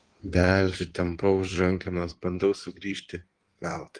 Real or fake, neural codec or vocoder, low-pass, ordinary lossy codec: fake; codec, 16 kHz in and 24 kHz out, 1.1 kbps, FireRedTTS-2 codec; 9.9 kHz; Opus, 24 kbps